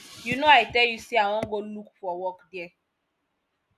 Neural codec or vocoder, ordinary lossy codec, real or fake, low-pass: none; none; real; 14.4 kHz